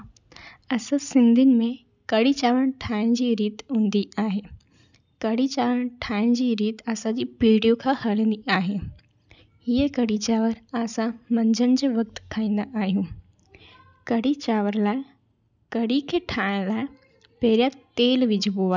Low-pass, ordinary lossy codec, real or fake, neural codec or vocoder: 7.2 kHz; none; real; none